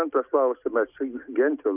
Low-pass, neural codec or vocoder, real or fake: 3.6 kHz; none; real